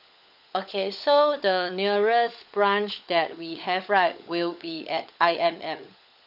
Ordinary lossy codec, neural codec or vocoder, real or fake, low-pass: none; codec, 16 kHz, 16 kbps, FunCodec, trained on LibriTTS, 50 frames a second; fake; 5.4 kHz